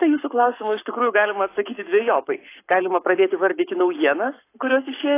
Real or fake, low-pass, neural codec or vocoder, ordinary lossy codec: fake; 3.6 kHz; codec, 44.1 kHz, 7.8 kbps, Pupu-Codec; AAC, 24 kbps